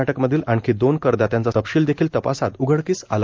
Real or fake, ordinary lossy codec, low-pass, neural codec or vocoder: real; Opus, 32 kbps; 7.2 kHz; none